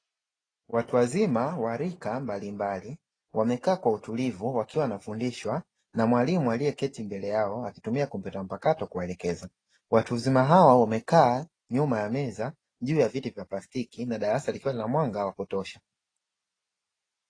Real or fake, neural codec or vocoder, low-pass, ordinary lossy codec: real; none; 9.9 kHz; AAC, 32 kbps